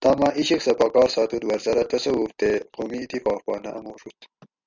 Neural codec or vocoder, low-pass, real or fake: none; 7.2 kHz; real